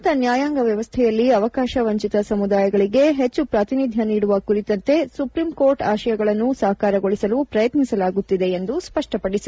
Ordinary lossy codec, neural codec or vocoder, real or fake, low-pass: none; none; real; none